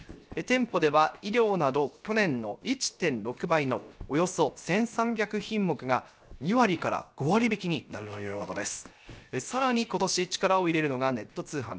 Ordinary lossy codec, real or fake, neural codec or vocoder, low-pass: none; fake; codec, 16 kHz, 0.7 kbps, FocalCodec; none